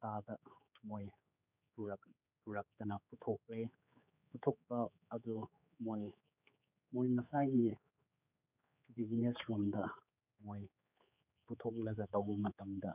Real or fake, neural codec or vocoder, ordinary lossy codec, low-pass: fake; codec, 16 kHz, 4 kbps, X-Codec, HuBERT features, trained on general audio; none; 3.6 kHz